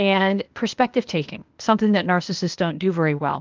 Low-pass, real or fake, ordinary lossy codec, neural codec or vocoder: 7.2 kHz; fake; Opus, 24 kbps; codec, 16 kHz, 0.8 kbps, ZipCodec